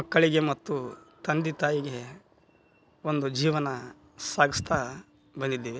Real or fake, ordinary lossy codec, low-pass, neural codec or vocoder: real; none; none; none